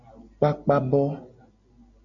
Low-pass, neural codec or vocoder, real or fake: 7.2 kHz; none; real